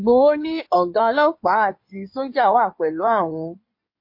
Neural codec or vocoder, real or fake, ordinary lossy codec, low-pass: codec, 16 kHz in and 24 kHz out, 2.2 kbps, FireRedTTS-2 codec; fake; MP3, 32 kbps; 5.4 kHz